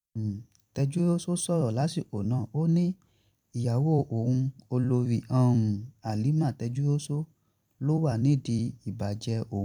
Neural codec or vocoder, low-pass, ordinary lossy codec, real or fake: vocoder, 44.1 kHz, 128 mel bands every 256 samples, BigVGAN v2; 19.8 kHz; none; fake